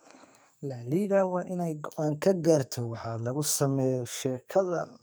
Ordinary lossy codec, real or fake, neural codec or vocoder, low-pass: none; fake; codec, 44.1 kHz, 2.6 kbps, SNAC; none